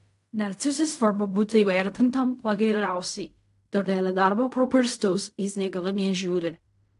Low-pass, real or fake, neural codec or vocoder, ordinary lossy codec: 10.8 kHz; fake; codec, 16 kHz in and 24 kHz out, 0.4 kbps, LongCat-Audio-Codec, fine tuned four codebook decoder; AAC, 64 kbps